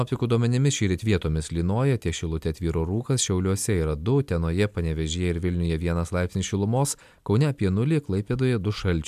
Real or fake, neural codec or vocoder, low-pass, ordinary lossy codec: real; none; 14.4 kHz; MP3, 96 kbps